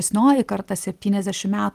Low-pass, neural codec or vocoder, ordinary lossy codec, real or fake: 14.4 kHz; none; Opus, 32 kbps; real